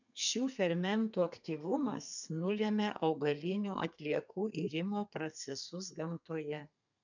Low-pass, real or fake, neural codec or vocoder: 7.2 kHz; fake; codec, 32 kHz, 1.9 kbps, SNAC